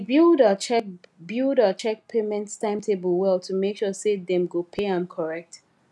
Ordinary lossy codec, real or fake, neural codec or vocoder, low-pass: none; real; none; none